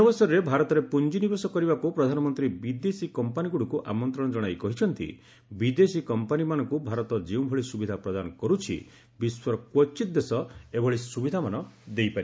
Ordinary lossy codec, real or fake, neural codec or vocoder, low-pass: none; real; none; none